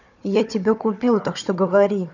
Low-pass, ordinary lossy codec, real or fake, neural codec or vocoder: 7.2 kHz; none; fake; codec, 16 kHz, 4 kbps, FunCodec, trained on Chinese and English, 50 frames a second